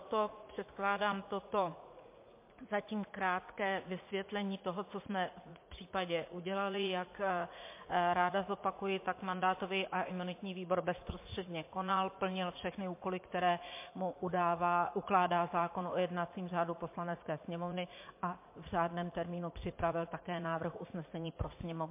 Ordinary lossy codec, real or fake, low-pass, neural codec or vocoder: MP3, 24 kbps; fake; 3.6 kHz; vocoder, 44.1 kHz, 80 mel bands, Vocos